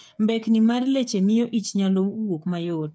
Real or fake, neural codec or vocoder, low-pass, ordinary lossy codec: fake; codec, 16 kHz, 8 kbps, FreqCodec, smaller model; none; none